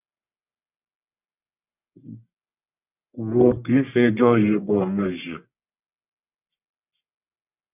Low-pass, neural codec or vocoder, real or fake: 3.6 kHz; codec, 44.1 kHz, 1.7 kbps, Pupu-Codec; fake